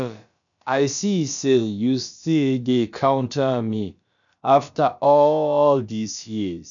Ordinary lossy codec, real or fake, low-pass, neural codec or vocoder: none; fake; 7.2 kHz; codec, 16 kHz, about 1 kbps, DyCAST, with the encoder's durations